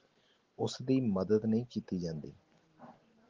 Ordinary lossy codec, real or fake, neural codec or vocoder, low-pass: Opus, 16 kbps; real; none; 7.2 kHz